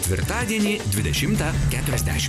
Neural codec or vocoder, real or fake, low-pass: none; real; 14.4 kHz